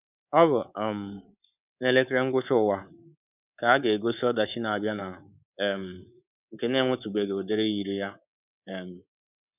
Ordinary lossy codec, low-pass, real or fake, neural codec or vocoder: none; 3.6 kHz; fake; codec, 24 kHz, 3.1 kbps, DualCodec